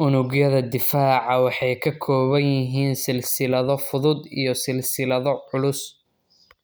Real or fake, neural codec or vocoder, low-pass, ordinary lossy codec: real; none; none; none